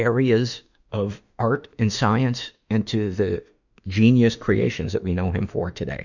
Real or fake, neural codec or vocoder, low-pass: fake; autoencoder, 48 kHz, 32 numbers a frame, DAC-VAE, trained on Japanese speech; 7.2 kHz